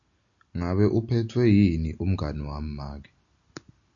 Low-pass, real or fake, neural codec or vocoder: 7.2 kHz; real; none